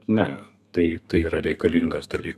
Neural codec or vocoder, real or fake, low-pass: codec, 32 kHz, 1.9 kbps, SNAC; fake; 14.4 kHz